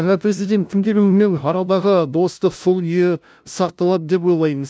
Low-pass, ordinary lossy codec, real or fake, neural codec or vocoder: none; none; fake; codec, 16 kHz, 0.5 kbps, FunCodec, trained on LibriTTS, 25 frames a second